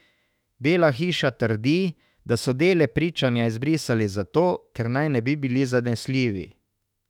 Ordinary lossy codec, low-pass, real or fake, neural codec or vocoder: none; 19.8 kHz; fake; autoencoder, 48 kHz, 32 numbers a frame, DAC-VAE, trained on Japanese speech